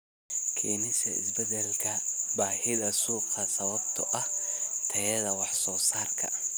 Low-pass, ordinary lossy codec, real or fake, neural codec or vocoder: none; none; real; none